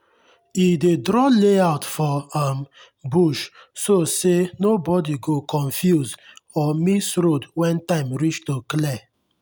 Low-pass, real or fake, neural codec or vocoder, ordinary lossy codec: none; real; none; none